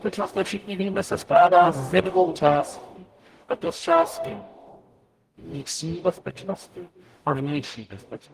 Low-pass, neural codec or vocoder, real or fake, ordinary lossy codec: 14.4 kHz; codec, 44.1 kHz, 0.9 kbps, DAC; fake; Opus, 24 kbps